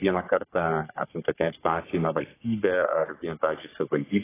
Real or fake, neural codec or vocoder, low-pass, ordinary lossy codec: fake; codec, 44.1 kHz, 3.4 kbps, Pupu-Codec; 3.6 kHz; AAC, 16 kbps